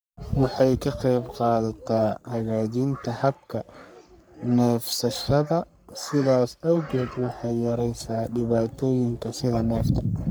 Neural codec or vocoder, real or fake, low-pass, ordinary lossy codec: codec, 44.1 kHz, 3.4 kbps, Pupu-Codec; fake; none; none